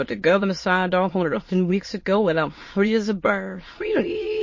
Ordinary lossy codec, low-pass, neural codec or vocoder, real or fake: MP3, 32 kbps; 7.2 kHz; autoencoder, 22.05 kHz, a latent of 192 numbers a frame, VITS, trained on many speakers; fake